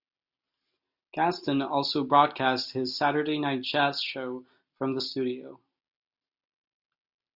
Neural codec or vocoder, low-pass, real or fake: none; 5.4 kHz; real